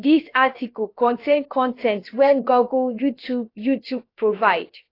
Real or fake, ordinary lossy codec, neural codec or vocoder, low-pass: fake; AAC, 32 kbps; codec, 16 kHz, about 1 kbps, DyCAST, with the encoder's durations; 5.4 kHz